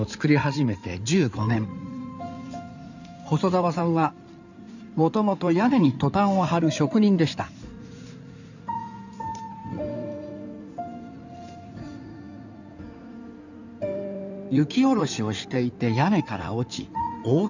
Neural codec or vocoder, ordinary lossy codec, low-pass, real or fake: codec, 16 kHz in and 24 kHz out, 2.2 kbps, FireRedTTS-2 codec; none; 7.2 kHz; fake